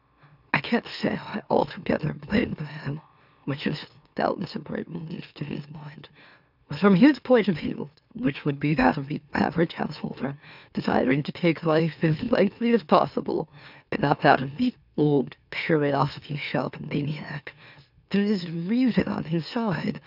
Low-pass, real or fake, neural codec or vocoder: 5.4 kHz; fake; autoencoder, 44.1 kHz, a latent of 192 numbers a frame, MeloTTS